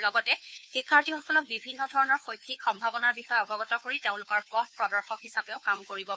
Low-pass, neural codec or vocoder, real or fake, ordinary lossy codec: none; codec, 16 kHz, 2 kbps, FunCodec, trained on Chinese and English, 25 frames a second; fake; none